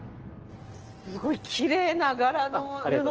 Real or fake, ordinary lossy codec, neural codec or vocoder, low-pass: real; Opus, 16 kbps; none; 7.2 kHz